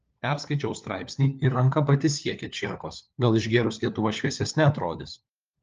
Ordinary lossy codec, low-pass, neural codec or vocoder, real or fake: Opus, 24 kbps; 7.2 kHz; codec, 16 kHz, 4 kbps, FunCodec, trained on LibriTTS, 50 frames a second; fake